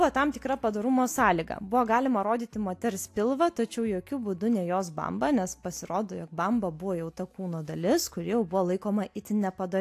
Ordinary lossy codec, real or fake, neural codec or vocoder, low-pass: AAC, 64 kbps; real; none; 14.4 kHz